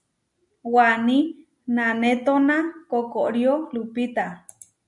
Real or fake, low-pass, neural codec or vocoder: real; 10.8 kHz; none